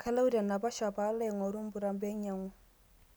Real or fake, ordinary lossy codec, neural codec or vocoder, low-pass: real; none; none; none